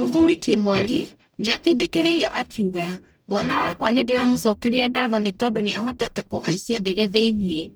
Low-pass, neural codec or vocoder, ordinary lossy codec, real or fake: none; codec, 44.1 kHz, 0.9 kbps, DAC; none; fake